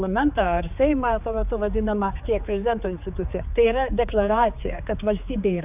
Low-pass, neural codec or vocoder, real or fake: 3.6 kHz; codec, 16 kHz, 4 kbps, X-Codec, HuBERT features, trained on general audio; fake